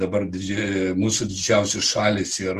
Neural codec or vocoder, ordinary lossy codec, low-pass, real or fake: none; Opus, 24 kbps; 14.4 kHz; real